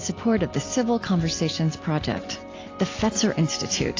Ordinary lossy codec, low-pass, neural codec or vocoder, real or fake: AAC, 32 kbps; 7.2 kHz; none; real